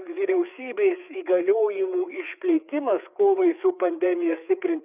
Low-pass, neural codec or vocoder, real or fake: 3.6 kHz; codec, 16 kHz, 4 kbps, FreqCodec, larger model; fake